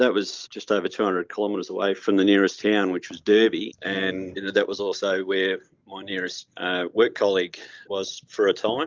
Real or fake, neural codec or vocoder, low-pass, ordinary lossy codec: real; none; 7.2 kHz; Opus, 24 kbps